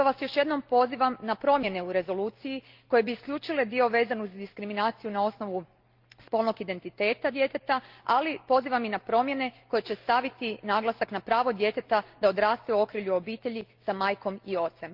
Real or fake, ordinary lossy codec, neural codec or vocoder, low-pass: real; Opus, 32 kbps; none; 5.4 kHz